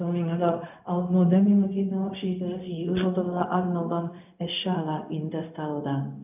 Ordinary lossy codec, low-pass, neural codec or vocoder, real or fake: none; 3.6 kHz; codec, 16 kHz, 0.4 kbps, LongCat-Audio-Codec; fake